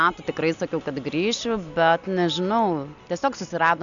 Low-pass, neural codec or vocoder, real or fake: 7.2 kHz; none; real